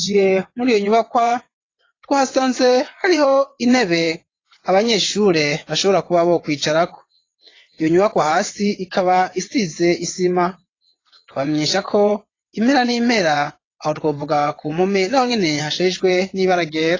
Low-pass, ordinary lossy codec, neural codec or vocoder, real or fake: 7.2 kHz; AAC, 32 kbps; vocoder, 22.05 kHz, 80 mel bands, WaveNeXt; fake